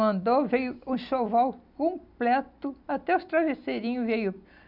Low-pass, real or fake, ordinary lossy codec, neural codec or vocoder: 5.4 kHz; real; none; none